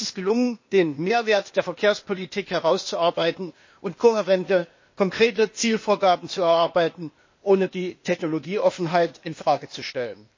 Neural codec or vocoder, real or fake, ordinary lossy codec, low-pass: codec, 16 kHz, 0.8 kbps, ZipCodec; fake; MP3, 32 kbps; 7.2 kHz